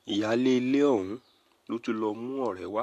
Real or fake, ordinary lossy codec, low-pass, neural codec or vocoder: real; AAC, 64 kbps; 14.4 kHz; none